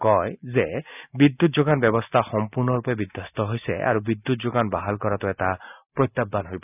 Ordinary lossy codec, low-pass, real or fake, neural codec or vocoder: none; 3.6 kHz; real; none